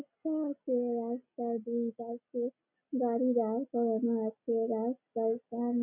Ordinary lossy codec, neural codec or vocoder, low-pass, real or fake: none; none; 3.6 kHz; real